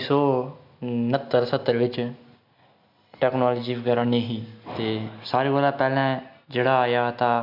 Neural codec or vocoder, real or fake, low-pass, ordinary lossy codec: none; real; 5.4 kHz; MP3, 48 kbps